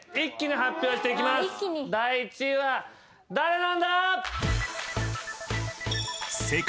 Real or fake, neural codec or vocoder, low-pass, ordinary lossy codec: real; none; none; none